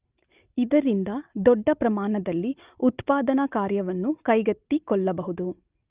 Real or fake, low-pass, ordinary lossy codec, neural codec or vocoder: real; 3.6 kHz; Opus, 32 kbps; none